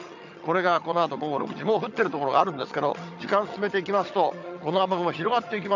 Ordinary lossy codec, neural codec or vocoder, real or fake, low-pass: none; vocoder, 22.05 kHz, 80 mel bands, HiFi-GAN; fake; 7.2 kHz